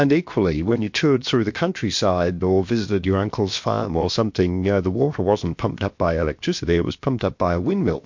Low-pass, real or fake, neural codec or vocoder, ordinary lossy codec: 7.2 kHz; fake; codec, 16 kHz, about 1 kbps, DyCAST, with the encoder's durations; MP3, 48 kbps